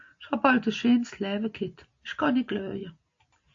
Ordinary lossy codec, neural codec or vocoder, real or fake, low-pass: AAC, 48 kbps; none; real; 7.2 kHz